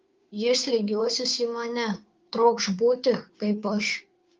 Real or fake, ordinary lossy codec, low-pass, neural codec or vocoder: fake; Opus, 24 kbps; 7.2 kHz; codec, 16 kHz, 2 kbps, FunCodec, trained on Chinese and English, 25 frames a second